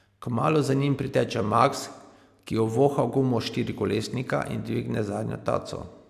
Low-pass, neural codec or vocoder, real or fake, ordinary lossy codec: 14.4 kHz; none; real; AAC, 96 kbps